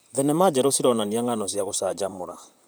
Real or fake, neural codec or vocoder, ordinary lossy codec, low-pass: fake; vocoder, 44.1 kHz, 128 mel bands, Pupu-Vocoder; none; none